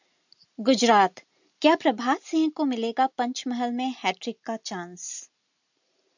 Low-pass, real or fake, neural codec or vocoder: 7.2 kHz; real; none